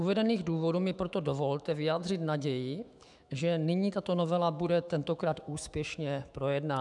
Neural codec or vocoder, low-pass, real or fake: autoencoder, 48 kHz, 128 numbers a frame, DAC-VAE, trained on Japanese speech; 10.8 kHz; fake